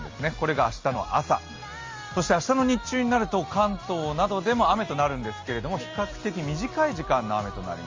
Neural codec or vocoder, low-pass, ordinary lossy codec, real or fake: none; 7.2 kHz; Opus, 32 kbps; real